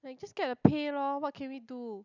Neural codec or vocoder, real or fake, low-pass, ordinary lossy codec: none; real; 7.2 kHz; none